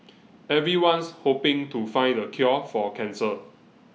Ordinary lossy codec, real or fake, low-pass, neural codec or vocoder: none; real; none; none